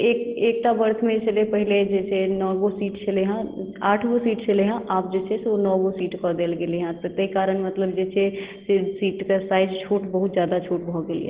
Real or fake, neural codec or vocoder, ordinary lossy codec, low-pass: real; none; Opus, 16 kbps; 3.6 kHz